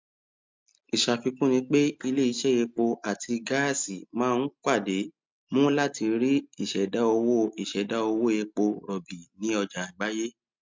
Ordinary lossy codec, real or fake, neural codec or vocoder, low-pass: MP3, 64 kbps; real; none; 7.2 kHz